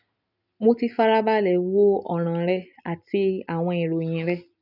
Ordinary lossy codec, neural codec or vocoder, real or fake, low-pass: none; none; real; 5.4 kHz